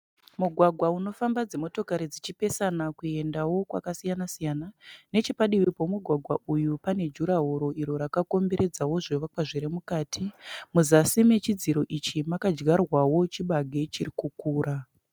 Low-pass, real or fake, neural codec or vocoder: 19.8 kHz; real; none